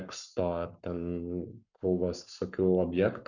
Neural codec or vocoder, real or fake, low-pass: codec, 44.1 kHz, 7.8 kbps, Pupu-Codec; fake; 7.2 kHz